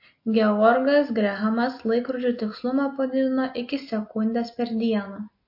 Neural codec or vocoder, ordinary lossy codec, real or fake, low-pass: none; MP3, 32 kbps; real; 5.4 kHz